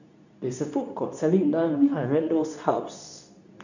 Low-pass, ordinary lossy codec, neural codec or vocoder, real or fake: 7.2 kHz; none; codec, 24 kHz, 0.9 kbps, WavTokenizer, medium speech release version 2; fake